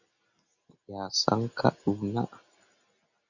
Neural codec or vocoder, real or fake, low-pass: none; real; 7.2 kHz